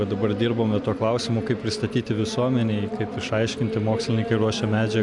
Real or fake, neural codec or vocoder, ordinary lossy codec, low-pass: real; none; MP3, 96 kbps; 10.8 kHz